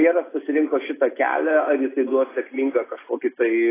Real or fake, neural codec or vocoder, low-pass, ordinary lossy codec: real; none; 3.6 kHz; AAC, 16 kbps